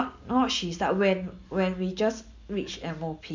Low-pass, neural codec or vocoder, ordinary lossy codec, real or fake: 7.2 kHz; none; MP3, 48 kbps; real